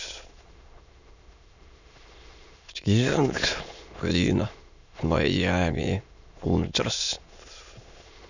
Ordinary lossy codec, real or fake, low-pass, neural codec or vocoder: none; fake; 7.2 kHz; autoencoder, 22.05 kHz, a latent of 192 numbers a frame, VITS, trained on many speakers